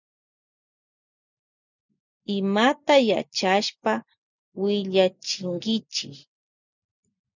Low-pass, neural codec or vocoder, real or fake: 7.2 kHz; none; real